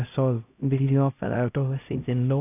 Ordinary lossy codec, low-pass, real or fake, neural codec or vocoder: none; 3.6 kHz; fake; codec, 16 kHz, 0.5 kbps, X-Codec, HuBERT features, trained on LibriSpeech